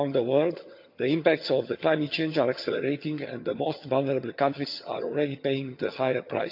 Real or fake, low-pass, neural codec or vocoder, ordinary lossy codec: fake; 5.4 kHz; vocoder, 22.05 kHz, 80 mel bands, HiFi-GAN; none